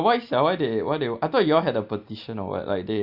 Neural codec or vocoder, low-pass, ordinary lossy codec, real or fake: none; 5.4 kHz; none; real